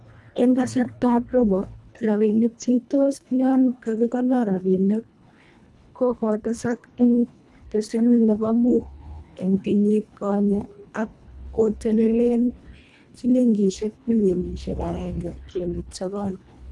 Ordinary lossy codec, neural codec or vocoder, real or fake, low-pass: none; codec, 24 kHz, 1.5 kbps, HILCodec; fake; none